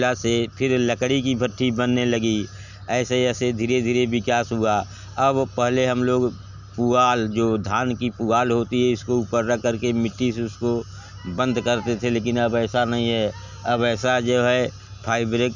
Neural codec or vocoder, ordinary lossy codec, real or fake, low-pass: none; none; real; 7.2 kHz